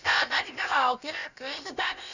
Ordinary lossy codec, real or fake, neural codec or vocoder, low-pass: none; fake; codec, 16 kHz, about 1 kbps, DyCAST, with the encoder's durations; 7.2 kHz